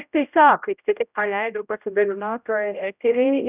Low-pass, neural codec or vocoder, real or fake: 3.6 kHz; codec, 16 kHz, 0.5 kbps, X-Codec, HuBERT features, trained on general audio; fake